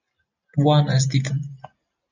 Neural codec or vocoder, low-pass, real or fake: none; 7.2 kHz; real